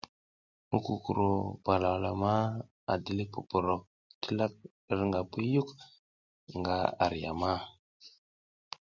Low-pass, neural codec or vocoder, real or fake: 7.2 kHz; none; real